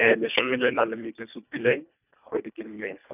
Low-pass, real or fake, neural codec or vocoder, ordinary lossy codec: 3.6 kHz; fake; codec, 24 kHz, 1.5 kbps, HILCodec; none